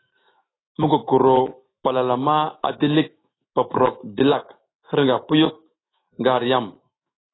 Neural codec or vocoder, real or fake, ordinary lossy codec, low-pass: autoencoder, 48 kHz, 128 numbers a frame, DAC-VAE, trained on Japanese speech; fake; AAC, 16 kbps; 7.2 kHz